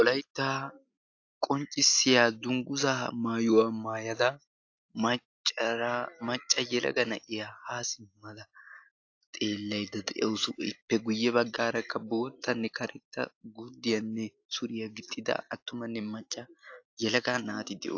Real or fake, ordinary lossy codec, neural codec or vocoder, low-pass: real; AAC, 48 kbps; none; 7.2 kHz